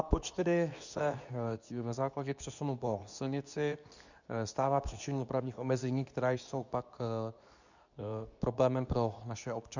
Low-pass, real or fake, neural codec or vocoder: 7.2 kHz; fake; codec, 24 kHz, 0.9 kbps, WavTokenizer, medium speech release version 2